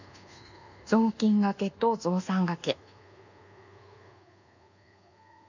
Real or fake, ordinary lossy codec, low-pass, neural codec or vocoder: fake; none; 7.2 kHz; codec, 24 kHz, 1.2 kbps, DualCodec